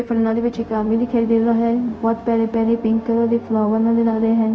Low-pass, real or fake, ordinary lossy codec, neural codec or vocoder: none; fake; none; codec, 16 kHz, 0.4 kbps, LongCat-Audio-Codec